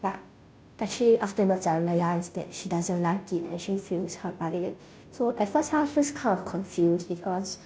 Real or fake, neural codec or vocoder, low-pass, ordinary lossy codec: fake; codec, 16 kHz, 0.5 kbps, FunCodec, trained on Chinese and English, 25 frames a second; none; none